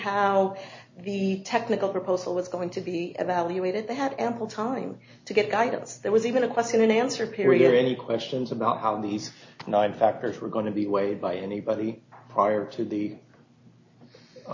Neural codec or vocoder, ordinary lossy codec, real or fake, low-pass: none; MP3, 32 kbps; real; 7.2 kHz